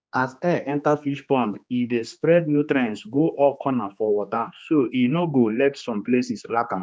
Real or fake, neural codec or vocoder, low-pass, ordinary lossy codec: fake; codec, 16 kHz, 2 kbps, X-Codec, HuBERT features, trained on general audio; none; none